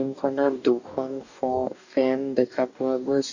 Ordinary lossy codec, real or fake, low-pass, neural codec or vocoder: none; fake; 7.2 kHz; codec, 44.1 kHz, 2.6 kbps, DAC